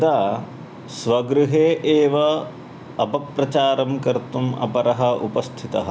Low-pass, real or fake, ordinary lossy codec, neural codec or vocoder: none; real; none; none